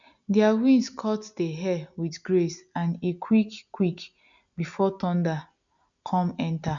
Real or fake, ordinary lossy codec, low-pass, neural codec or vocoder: real; none; 7.2 kHz; none